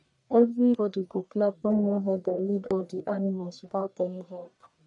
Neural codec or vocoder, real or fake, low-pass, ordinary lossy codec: codec, 44.1 kHz, 1.7 kbps, Pupu-Codec; fake; 10.8 kHz; none